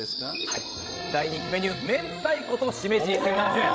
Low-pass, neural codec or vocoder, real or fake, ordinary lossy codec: none; codec, 16 kHz, 16 kbps, FreqCodec, larger model; fake; none